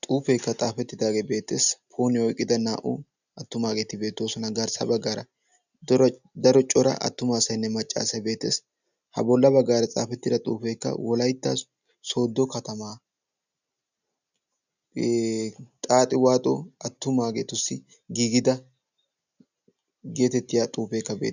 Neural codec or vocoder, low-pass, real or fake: none; 7.2 kHz; real